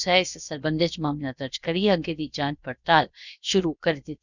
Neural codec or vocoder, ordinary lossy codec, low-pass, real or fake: codec, 16 kHz, 0.7 kbps, FocalCodec; none; 7.2 kHz; fake